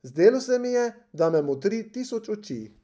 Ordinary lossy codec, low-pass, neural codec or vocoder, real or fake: none; none; none; real